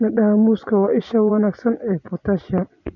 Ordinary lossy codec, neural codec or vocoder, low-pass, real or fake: none; vocoder, 24 kHz, 100 mel bands, Vocos; 7.2 kHz; fake